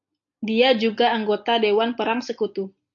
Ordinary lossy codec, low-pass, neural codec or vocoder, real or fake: AAC, 64 kbps; 7.2 kHz; none; real